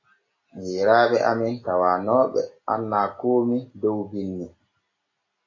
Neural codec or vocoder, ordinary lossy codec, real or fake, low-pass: none; AAC, 32 kbps; real; 7.2 kHz